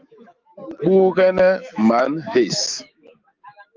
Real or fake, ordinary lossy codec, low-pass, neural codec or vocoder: fake; Opus, 24 kbps; 7.2 kHz; autoencoder, 48 kHz, 128 numbers a frame, DAC-VAE, trained on Japanese speech